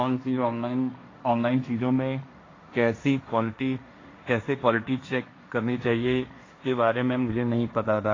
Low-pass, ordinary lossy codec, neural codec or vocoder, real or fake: 7.2 kHz; AAC, 32 kbps; codec, 16 kHz, 1.1 kbps, Voila-Tokenizer; fake